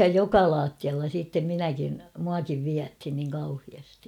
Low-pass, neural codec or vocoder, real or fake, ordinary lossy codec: 19.8 kHz; none; real; none